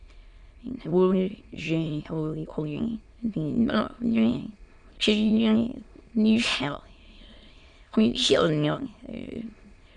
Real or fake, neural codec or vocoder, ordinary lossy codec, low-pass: fake; autoencoder, 22.05 kHz, a latent of 192 numbers a frame, VITS, trained on many speakers; Opus, 64 kbps; 9.9 kHz